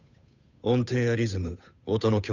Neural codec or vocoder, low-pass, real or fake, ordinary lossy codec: codec, 16 kHz, 8 kbps, FunCodec, trained on Chinese and English, 25 frames a second; 7.2 kHz; fake; none